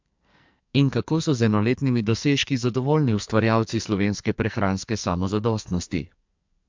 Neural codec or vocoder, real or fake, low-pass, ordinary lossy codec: codec, 44.1 kHz, 2.6 kbps, SNAC; fake; 7.2 kHz; MP3, 64 kbps